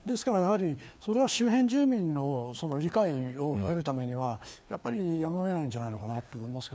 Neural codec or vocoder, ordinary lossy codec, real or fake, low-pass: codec, 16 kHz, 2 kbps, FreqCodec, larger model; none; fake; none